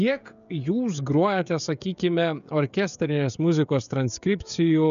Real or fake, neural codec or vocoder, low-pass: fake; codec, 16 kHz, 16 kbps, FreqCodec, smaller model; 7.2 kHz